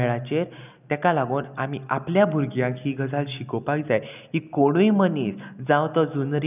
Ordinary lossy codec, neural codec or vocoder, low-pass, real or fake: none; none; 3.6 kHz; real